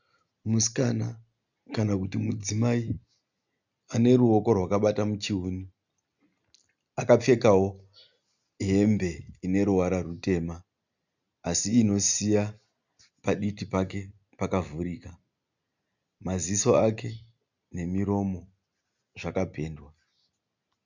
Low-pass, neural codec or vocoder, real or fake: 7.2 kHz; none; real